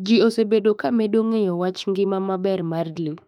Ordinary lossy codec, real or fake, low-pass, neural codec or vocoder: none; fake; 19.8 kHz; autoencoder, 48 kHz, 32 numbers a frame, DAC-VAE, trained on Japanese speech